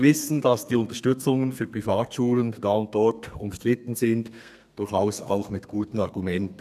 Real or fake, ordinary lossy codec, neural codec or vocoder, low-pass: fake; none; codec, 32 kHz, 1.9 kbps, SNAC; 14.4 kHz